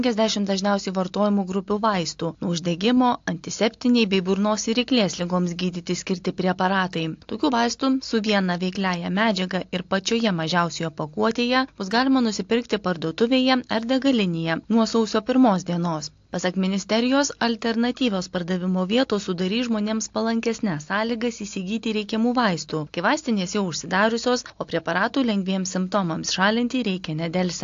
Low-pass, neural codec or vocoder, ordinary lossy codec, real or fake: 7.2 kHz; none; AAC, 48 kbps; real